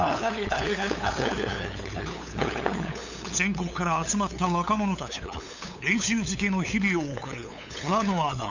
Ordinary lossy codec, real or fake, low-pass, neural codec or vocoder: none; fake; 7.2 kHz; codec, 16 kHz, 8 kbps, FunCodec, trained on LibriTTS, 25 frames a second